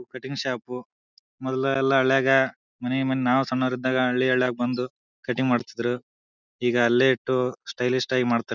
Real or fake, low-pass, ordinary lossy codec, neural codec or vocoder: real; 7.2 kHz; none; none